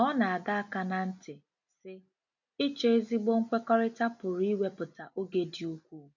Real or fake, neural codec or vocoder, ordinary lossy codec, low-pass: real; none; none; 7.2 kHz